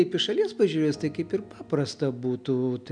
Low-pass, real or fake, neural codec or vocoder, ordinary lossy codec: 9.9 kHz; real; none; MP3, 96 kbps